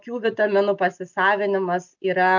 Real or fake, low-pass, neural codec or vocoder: real; 7.2 kHz; none